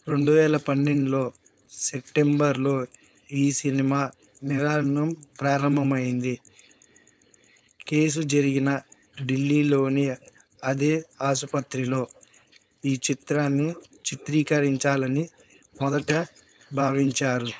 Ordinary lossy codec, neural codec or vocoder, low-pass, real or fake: none; codec, 16 kHz, 4.8 kbps, FACodec; none; fake